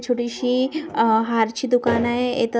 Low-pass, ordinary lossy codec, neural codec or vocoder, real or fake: none; none; none; real